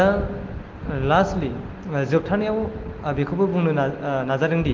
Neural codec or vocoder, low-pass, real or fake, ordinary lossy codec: none; 7.2 kHz; real; Opus, 16 kbps